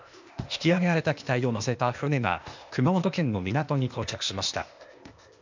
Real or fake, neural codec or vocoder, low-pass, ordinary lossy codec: fake; codec, 16 kHz, 0.8 kbps, ZipCodec; 7.2 kHz; MP3, 64 kbps